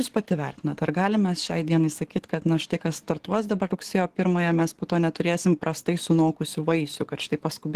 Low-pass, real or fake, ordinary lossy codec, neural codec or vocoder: 14.4 kHz; fake; Opus, 16 kbps; codec, 44.1 kHz, 7.8 kbps, DAC